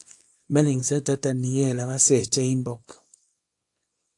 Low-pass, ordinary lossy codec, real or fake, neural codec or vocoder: 10.8 kHz; AAC, 64 kbps; fake; codec, 24 kHz, 0.9 kbps, WavTokenizer, small release